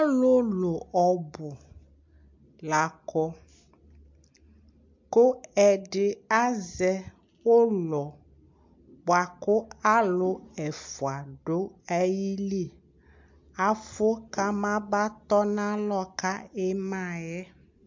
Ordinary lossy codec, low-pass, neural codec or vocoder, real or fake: MP3, 48 kbps; 7.2 kHz; none; real